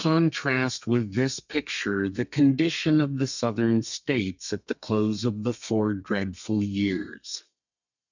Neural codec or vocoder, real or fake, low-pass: codec, 32 kHz, 1.9 kbps, SNAC; fake; 7.2 kHz